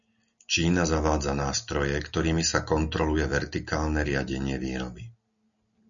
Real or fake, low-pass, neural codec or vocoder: real; 7.2 kHz; none